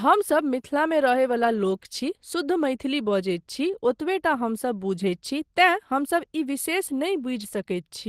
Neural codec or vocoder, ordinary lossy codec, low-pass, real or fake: none; Opus, 16 kbps; 14.4 kHz; real